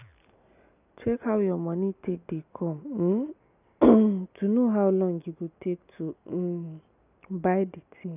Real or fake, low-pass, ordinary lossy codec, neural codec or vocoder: real; 3.6 kHz; none; none